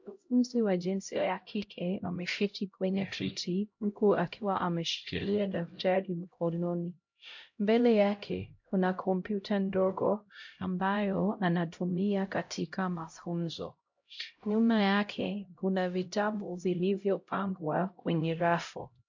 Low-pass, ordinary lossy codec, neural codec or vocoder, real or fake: 7.2 kHz; MP3, 48 kbps; codec, 16 kHz, 0.5 kbps, X-Codec, HuBERT features, trained on LibriSpeech; fake